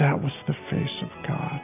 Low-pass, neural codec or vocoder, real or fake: 3.6 kHz; none; real